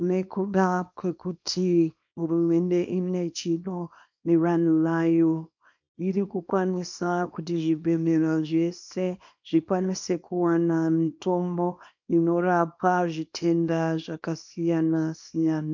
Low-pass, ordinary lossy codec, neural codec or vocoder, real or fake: 7.2 kHz; MP3, 48 kbps; codec, 24 kHz, 0.9 kbps, WavTokenizer, small release; fake